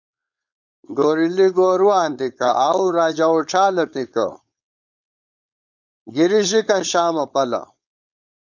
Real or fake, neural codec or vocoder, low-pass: fake; codec, 16 kHz, 4.8 kbps, FACodec; 7.2 kHz